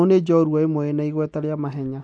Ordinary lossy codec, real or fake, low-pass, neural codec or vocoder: none; real; 9.9 kHz; none